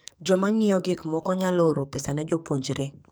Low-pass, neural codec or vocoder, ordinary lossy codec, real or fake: none; codec, 44.1 kHz, 2.6 kbps, SNAC; none; fake